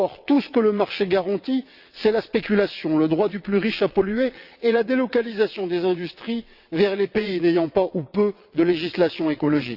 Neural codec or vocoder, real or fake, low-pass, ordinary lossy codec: vocoder, 22.05 kHz, 80 mel bands, WaveNeXt; fake; 5.4 kHz; Opus, 64 kbps